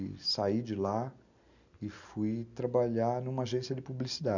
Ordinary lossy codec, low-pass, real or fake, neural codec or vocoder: none; 7.2 kHz; real; none